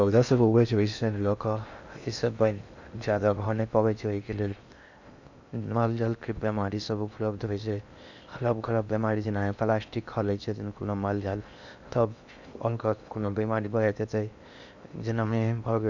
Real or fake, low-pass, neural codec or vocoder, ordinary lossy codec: fake; 7.2 kHz; codec, 16 kHz in and 24 kHz out, 0.6 kbps, FocalCodec, streaming, 2048 codes; none